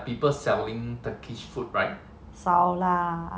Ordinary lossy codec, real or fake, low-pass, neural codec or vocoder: none; real; none; none